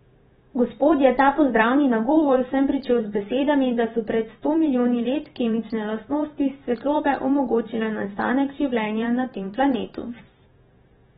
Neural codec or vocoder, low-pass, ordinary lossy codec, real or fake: vocoder, 44.1 kHz, 128 mel bands every 512 samples, BigVGAN v2; 19.8 kHz; AAC, 16 kbps; fake